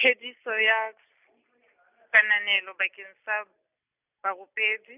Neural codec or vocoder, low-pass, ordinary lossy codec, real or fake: none; 3.6 kHz; none; real